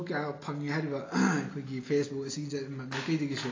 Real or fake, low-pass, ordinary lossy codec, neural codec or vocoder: real; 7.2 kHz; AAC, 32 kbps; none